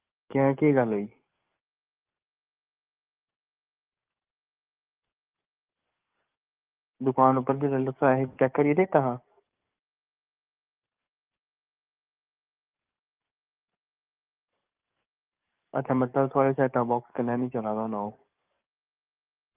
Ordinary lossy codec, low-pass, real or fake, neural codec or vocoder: Opus, 32 kbps; 3.6 kHz; fake; codec, 44.1 kHz, 7.8 kbps, DAC